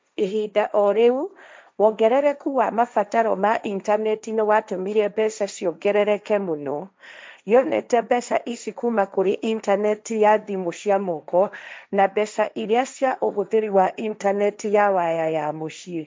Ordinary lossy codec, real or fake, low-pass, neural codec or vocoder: none; fake; none; codec, 16 kHz, 1.1 kbps, Voila-Tokenizer